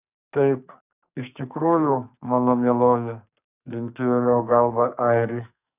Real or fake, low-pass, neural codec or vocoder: fake; 3.6 kHz; codec, 44.1 kHz, 2.6 kbps, SNAC